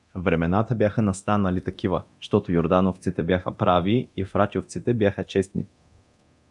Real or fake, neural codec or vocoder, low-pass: fake; codec, 24 kHz, 0.9 kbps, DualCodec; 10.8 kHz